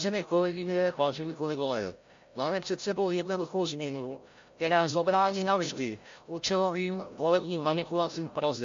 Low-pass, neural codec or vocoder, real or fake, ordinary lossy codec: 7.2 kHz; codec, 16 kHz, 0.5 kbps, FreqCodec, larger model; fake; MP3, 48 kbps